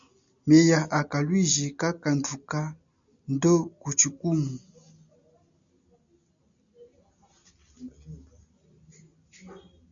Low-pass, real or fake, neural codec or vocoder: 7.2 kHz; real; none